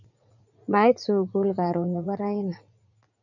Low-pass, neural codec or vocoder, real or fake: 7.2 kHz; vocoder, 44.1 kHz, 80 mel bands, Vocos; fake